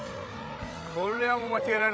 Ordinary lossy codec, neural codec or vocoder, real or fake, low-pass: none; codec, 16 kHz, 16 kbps, FreqCodec, larger model; fake; none